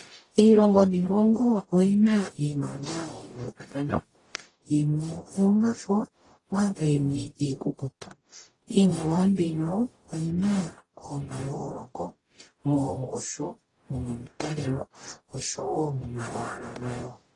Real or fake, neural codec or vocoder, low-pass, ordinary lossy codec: fake; codec, 44.1 kHz, 0.9 kbps, DAC; 10.8 kHz; AAC, 32 kbps